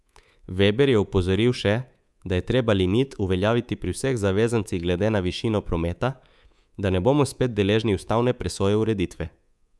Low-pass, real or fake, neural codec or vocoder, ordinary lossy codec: none; fake; codec, 24 kHz, 3.1 kbps, DualCodec; none